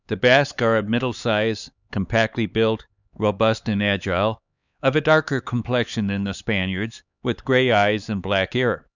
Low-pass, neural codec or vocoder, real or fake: 7.2 kHz; codec, 16 kHz, 4 kbps, X-Codec, HuBERT features, trained on LibriSpeech; fake